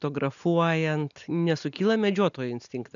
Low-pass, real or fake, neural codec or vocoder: 7.2 kHz; real; none